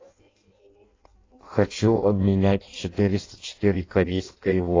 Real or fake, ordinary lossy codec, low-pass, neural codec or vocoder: fake; AAC, 32 kbps; 7.2 kHz; codec, 16 kHz in and 24 kHz out, 0.6 kbps, FireRedTTS-2 codec